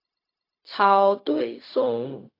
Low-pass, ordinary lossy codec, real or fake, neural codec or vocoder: 5.4 kHz; AAC, 32 kbps; fake; codec, 16 kHz, 0.4 kbps, LongCat-Audio-Codec